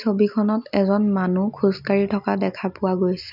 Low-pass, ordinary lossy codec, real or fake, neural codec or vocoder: 5.4 kHz; none; real; none